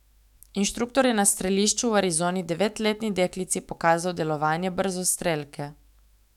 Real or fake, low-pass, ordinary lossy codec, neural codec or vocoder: fake; 19.8 kHz; none; autoencoder, 48 kHz, 128 numbers a frame, DAC-VAE, trained on Japanese speech